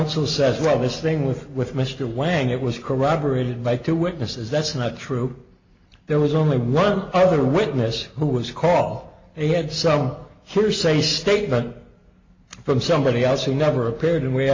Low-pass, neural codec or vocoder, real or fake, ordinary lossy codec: 7.2 kHz; none; real; MP3, 48 kbps